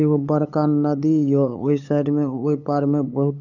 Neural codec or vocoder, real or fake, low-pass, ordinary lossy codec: codec, 16 kHz, 8 kbps, FunCodec, trained on LibriTTS, 25 frames a second; fake; 7.2 kHz; none